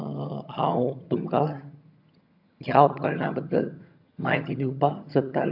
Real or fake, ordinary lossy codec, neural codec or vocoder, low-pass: fake; AAC, 48 kbps; vocoder, 22.05 kHz, 80 mel bands, HiFi-GAN; 5.4 kHz